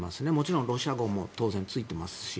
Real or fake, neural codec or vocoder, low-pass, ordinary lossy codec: real; none; none; none